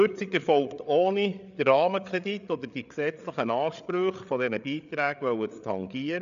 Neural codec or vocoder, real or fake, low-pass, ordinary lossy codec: codec, 16 kHz, 8 kbps, FreqCodec, larger model; fake; 7.2 kHz; none